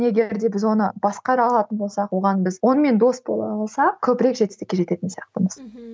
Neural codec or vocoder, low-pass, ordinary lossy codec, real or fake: none; none; none; real